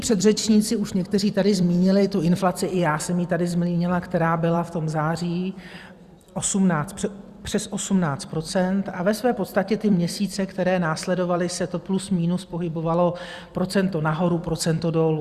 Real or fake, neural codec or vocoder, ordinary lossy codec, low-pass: fake; vocoder, 44.1 kHz, 128 mel bands every 256 samples, BigVGAN v2; Opus, 64 kbps; 14.4 kHz